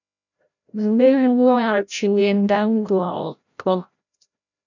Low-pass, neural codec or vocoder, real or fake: 7.2 kHz; codec, 16 kHz, 0.5 kbps, FreqCodec, larger model; fake